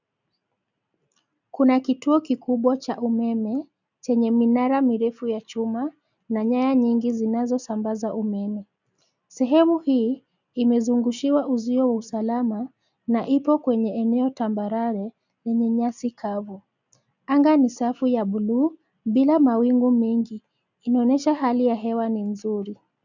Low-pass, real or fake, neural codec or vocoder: 7.2 kHz; real; none